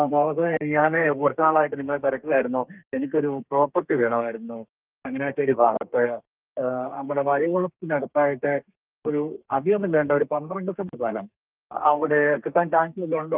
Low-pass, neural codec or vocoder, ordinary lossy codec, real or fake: 3.6 kHz; codec, 32 kHz, 1.9 kbps, SNAC; Opus, 24 kbps; fake